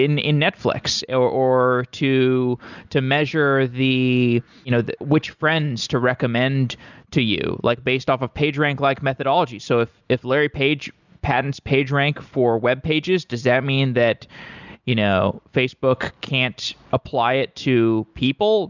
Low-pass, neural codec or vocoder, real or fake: 7.2 kHz; none; real